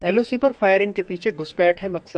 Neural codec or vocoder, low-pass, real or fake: codec, 32 kHz, 1.9 kbps, SNAC; 9.9 kHz; fake